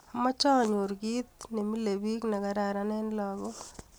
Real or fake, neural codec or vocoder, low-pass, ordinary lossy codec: real; none; none; none